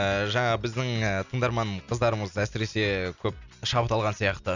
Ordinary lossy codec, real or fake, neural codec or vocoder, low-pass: none; real; none; 7.2 kHz